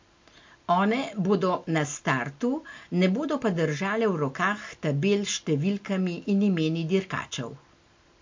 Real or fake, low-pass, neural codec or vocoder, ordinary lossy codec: real; 7.2 kHz; none; MP3, 48 kbps